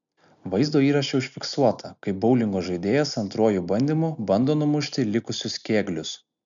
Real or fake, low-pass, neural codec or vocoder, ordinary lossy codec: real; 7.2 kHz; none; MP3, 96 kbps